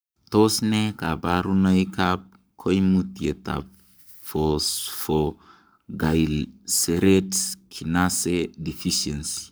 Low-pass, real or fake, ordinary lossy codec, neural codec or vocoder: none; fake; none; codec, 44.1 kHz, 7.8 kbps, Pupu-Codec